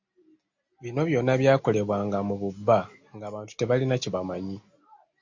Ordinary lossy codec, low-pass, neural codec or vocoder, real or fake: AAC, 48 kbps; 7.2 kHz; none; real